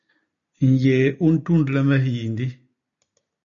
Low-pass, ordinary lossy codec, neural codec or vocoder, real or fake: 7.2 kHz; MP3, 96 kbps; none; real